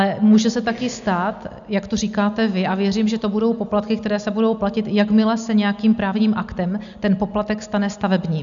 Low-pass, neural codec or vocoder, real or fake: 7.2 kHz; none; real